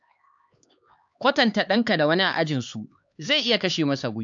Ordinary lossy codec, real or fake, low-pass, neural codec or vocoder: AAC, 64 kbps; fake; 7.2 kHz; codec, 16 kHz, 4 kbps, X-Codec, HuBERT features, trained on LibriSpeech